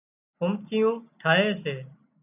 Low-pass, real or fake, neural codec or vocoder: 3.6 kHz; real; none